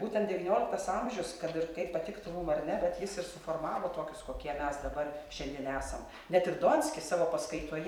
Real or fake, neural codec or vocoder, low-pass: fake; vocoder, 44.1 kHz, 128 mel bands every 256 samples, BigVGAN v2; 19.8 kHz